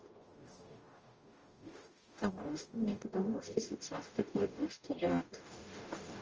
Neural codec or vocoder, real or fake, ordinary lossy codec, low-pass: codec, 44.1 kHz, 0.9 kbps, DAC; fake; Opus, 24 kbps; 7.2 kHz